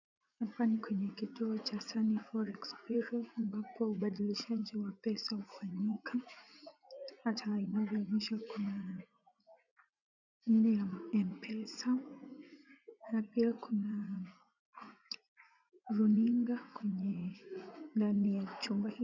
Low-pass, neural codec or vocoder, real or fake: 7.2 kHz; vocoder, 44.1 kHz, 80 mel bands, Vocos; fake